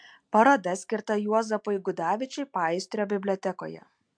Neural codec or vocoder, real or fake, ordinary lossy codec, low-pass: none; real; MP3, 64 kbps; 9.9 kHz